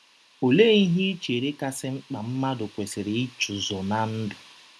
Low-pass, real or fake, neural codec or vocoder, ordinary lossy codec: none; real; none; none